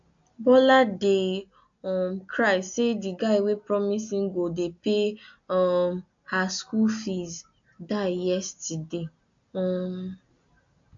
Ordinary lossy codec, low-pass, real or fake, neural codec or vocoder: AAC, 64 kbps; 7.2 kHz; real; none